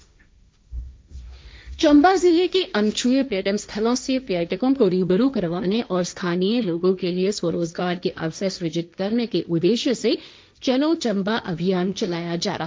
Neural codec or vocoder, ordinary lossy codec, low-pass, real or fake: codec, 16 kHz, 1.1 kbps, Voila-Tokenizer; none; none; fake